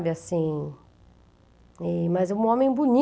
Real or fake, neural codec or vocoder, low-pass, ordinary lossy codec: real; none; none; none